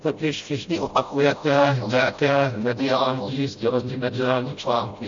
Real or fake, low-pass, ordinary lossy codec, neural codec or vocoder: fake; 7.2 kHz; MP3, 48 kbps; codec, 16 kHz, 0.5 kbps, FreqCodec, smaller model